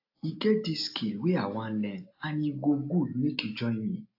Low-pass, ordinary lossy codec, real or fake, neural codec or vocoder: 5.4 kHz; AAC, 32 kbps; real; none